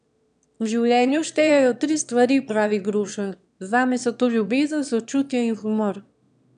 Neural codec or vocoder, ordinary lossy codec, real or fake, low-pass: autoencoder, 22.05 kHz, a latent of 192 numbers a frame, VITS, trained on one speaker; none; fake; 9.9 kHz